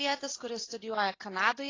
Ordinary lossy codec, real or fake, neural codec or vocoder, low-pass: AAC, 32 kbps; real; none; 7.2 kHz